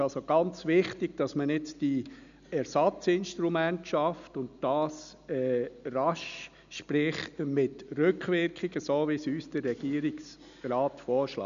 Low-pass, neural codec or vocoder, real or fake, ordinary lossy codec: 7.2 kHz; none; real; none